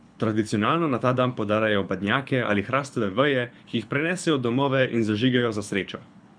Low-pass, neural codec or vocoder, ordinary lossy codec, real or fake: 9.9 kHz; codec, 24 kHz, 6 kbps, HILCodec; none; fake